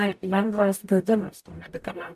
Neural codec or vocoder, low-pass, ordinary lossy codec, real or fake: codec, 44.1 kHz, 0.9 kbps, DAC; 14.4 kHz; AAC, 96 kbps; fake